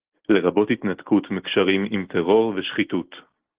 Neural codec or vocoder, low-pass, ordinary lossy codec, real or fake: none; 3.6 kHz; Opus, 16 kbps; real